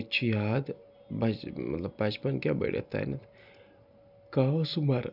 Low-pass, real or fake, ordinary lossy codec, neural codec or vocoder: 5.4 kHz; real; none; none